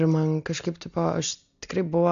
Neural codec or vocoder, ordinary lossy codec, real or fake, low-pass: none; MP3, 64 kbps; real; 7.2 kHz